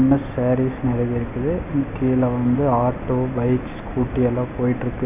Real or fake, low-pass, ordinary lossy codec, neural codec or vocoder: real; 3.6 kHz; none; none